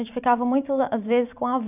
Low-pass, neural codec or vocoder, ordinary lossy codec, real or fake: 3.6 kHz; vocoder, 44.1 kHz, 128 mel bands every 512 samples, BigVGAN v2; none; fake